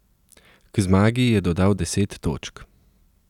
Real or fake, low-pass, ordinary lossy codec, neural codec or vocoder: real; 19.8 kHz; none; none